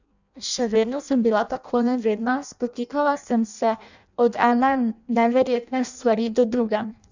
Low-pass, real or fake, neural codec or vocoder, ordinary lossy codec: 7.2 kHz; fake; codec, 16 kHz in and 24 kHz out, 0.6 kbps, FireRedTTS-2 codec; none